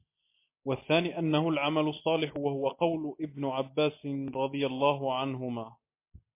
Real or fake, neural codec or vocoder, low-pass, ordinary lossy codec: real; none; 3.6 kHz; MP3, 24 kbps